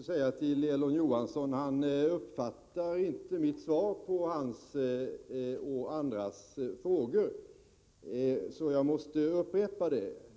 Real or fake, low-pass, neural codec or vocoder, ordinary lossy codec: real; none; none; none